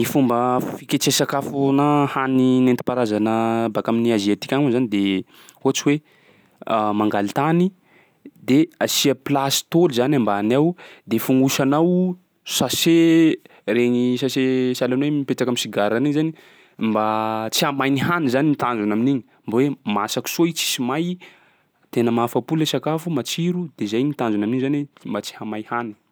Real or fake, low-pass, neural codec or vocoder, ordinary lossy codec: real; none; none; none